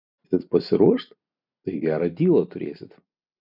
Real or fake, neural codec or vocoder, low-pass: real; none; 5.4 kHz